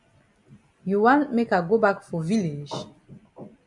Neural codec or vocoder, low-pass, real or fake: none; 10.8 kHz; real